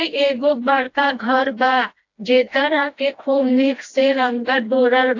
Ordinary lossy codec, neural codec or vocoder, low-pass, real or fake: AAC, 48 kbps; codec, 16 kHz, 1 kbps, FreqCodec, smaller model; 7.2 kHz; fake